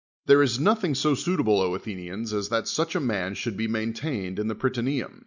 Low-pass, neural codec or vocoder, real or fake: 7.2 kHz; none; real